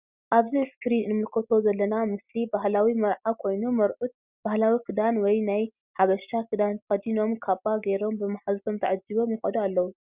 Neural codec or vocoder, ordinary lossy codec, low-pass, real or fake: none; Opus, 64 kbps; 3.6 kHz; real